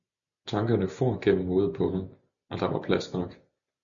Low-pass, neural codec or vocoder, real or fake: 7.2 kHz; none; real